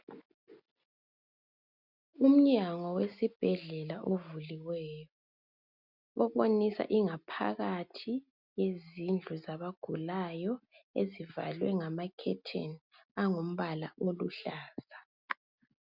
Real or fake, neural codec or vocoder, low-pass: real; none; 5.4 kHz